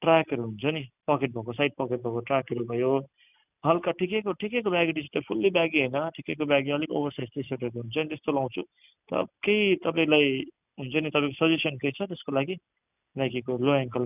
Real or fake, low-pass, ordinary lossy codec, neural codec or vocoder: real; 3.6 kHz; none; none